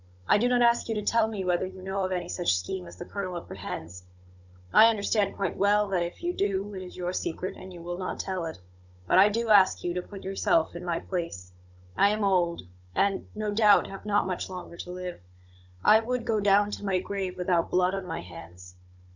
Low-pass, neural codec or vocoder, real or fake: 7.2 kHz; codec, 16 kHz, 16 kbps, FunCodec, trained on Chinese and English, 50 frames a second; fake